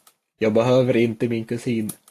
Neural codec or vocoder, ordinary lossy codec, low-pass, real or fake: none; AAC, 64 kbps; 14.4 kHz; real